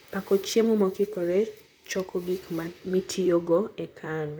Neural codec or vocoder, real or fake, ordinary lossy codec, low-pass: vocoder, 44.1 kHz, 128 mel bands, Pupu-Vocoder; fake; none; none